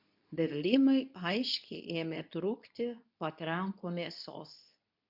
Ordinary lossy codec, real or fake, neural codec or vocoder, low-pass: AAC, 48 kbps; fake; codec, 24 kHz, 0.9 kbps, WavTokenizer, medium speech release version 2; 5.4 kHz